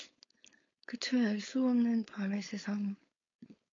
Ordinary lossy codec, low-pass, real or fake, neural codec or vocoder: AAC, 32 kbps; 7.2 kHz; fake; codec, 16 kHz, 4.8 kbps, FACodec